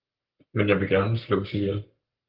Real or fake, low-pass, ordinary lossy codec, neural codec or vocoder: fake; 5.4 kHz; Opus, 32 kbps; codec, 44.1 kHz, 7.8 kbps, Pupu-Codec